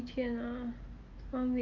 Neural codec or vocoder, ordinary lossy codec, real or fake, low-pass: none; Opus, 24 kbps; real; 7.2 kHz